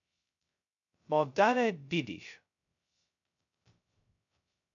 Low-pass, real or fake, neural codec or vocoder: 7.2 kHz; fake; codec, 16 kHz, 0.2 kbps, FocalCodec